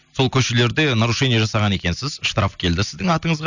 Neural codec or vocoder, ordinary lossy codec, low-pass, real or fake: none; none; 7.2 kHz; real